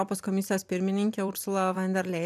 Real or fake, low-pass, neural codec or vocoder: fake; 14.4 kHz; vocoder, 44.1 kHz, 128 mel bands every 256 samples, BigVGAN v2